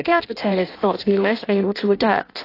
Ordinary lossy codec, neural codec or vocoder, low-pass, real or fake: AAC, 32 kbps; codec, 16 kHz in and 24 kHz out, 0.6 kbps, FireRedTTS-2 codec; 5.4 kHz; fake